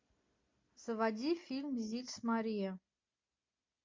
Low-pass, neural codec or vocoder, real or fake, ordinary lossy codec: 7.2 kHz; none; real; MP3, 48 kbps